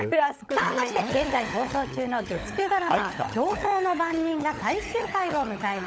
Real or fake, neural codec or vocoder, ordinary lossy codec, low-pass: fake; codec, 16 kHz, 16 kbps, FunCodec, trained on LibriTTS, 50 frames a second; none; none